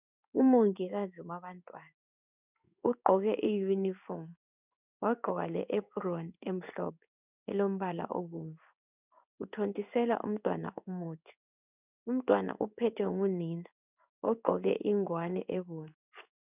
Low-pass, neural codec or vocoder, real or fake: 3.6 kHz; codec, 16 kHz in and 24 kHz out, 1 kbps, XY-Tokenizer; fake